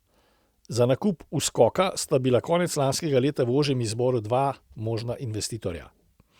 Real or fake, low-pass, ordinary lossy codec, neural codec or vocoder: real; 19.8 kHz; Opus, 64 kbps; none